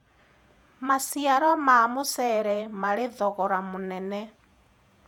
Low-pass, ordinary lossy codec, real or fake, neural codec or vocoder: 19.8 kHz; none; fake; vocoder, 48 kHz, 128 mel bands, Vocos